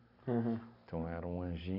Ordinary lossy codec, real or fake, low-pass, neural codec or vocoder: none; real; 5.4 kHz; none